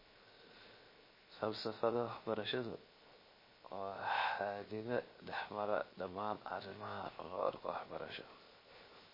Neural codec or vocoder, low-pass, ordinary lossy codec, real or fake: codec, 16 kHz, 0.7 kbps, FocalCodec; 5.4 kHz; MP3, 24 kbps; fake